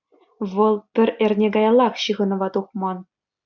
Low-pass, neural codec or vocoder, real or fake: 7.2 kHz; none; real